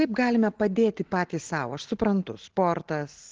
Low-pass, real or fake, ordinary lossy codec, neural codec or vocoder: 7.2 kHz; real; Opus, 16 kbps; none